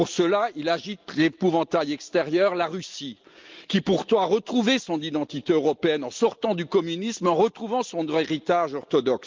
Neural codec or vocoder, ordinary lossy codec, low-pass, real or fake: none; Opus, 32 kbps; 7.2 kHz; real